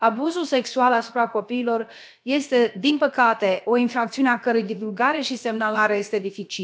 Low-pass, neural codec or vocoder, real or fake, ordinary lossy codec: none; codec, 16 kHz, about 1 kbps, DyCAST, with the encoder's durations; fake; none